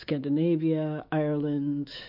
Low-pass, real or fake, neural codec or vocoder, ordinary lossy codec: 5.4 kHz; fake; autoencoder, 48 kHz, 128 numbers a frame, DAC-VAE, trained on Japanese speech; MP3, 48 kbps